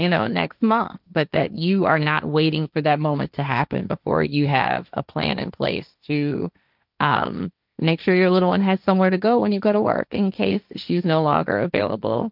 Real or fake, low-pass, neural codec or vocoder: fake; 5.4 kHz; codec, 16 kHz, 1.1 kbps, Voila-Tokenizer